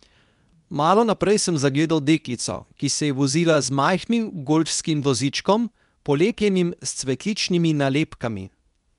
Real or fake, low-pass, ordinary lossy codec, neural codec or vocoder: fake; 10.8 kHz; none; codec, 24 kHz, 0.9 kbps, WavTokenizer, medium speech release version 2